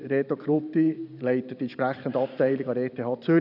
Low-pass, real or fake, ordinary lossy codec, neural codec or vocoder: 5.4 kHz; fake; none; vocoder, 44.1 kHz, 128 mel bands every 512 samples, BigVGAN v2